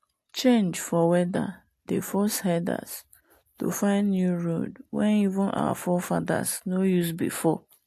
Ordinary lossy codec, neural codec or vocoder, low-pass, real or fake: AAC, 64 kbps; none; 14.4 kHz; real